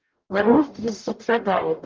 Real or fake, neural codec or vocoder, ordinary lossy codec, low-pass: fake; codec, 44.1 kHz, 0.9 kbps, DAC; Opus, 32 kbps; 7.2 kHz